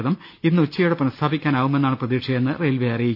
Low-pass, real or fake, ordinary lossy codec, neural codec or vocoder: 5.4 kHz; real; none; none